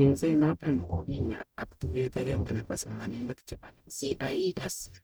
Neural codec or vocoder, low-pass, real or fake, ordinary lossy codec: codec, 44.1 kHz, 0.9 kbps, DAC; none; fake; none